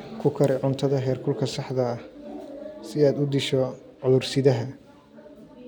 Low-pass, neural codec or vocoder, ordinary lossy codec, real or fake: none; none; none; real